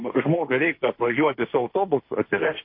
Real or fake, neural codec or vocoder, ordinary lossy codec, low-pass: fake; codec, 16 kHz, 1.1 kbps, Voila-Tokenizer; MP3, 32 kbps; 5.4 kHz